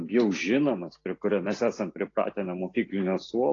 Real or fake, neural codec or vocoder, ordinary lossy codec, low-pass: real; none; AAC, 32 kbps; 7.2 kHz